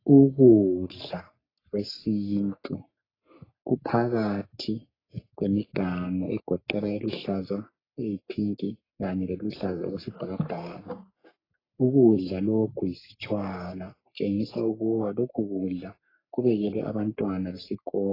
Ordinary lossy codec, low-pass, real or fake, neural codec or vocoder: AAC, 24 kbps; 5.4 kHz; fake; codec, 44.1 kHz, 3.4 kbps, Pupu-Codec